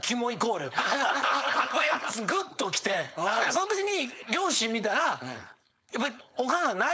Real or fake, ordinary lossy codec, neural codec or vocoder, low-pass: fake; none; codec, 16 kHz, 4.8 kbps, FACodec; none